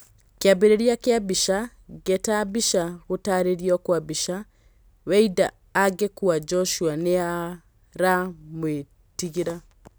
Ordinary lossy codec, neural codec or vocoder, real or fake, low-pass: none; none; real; none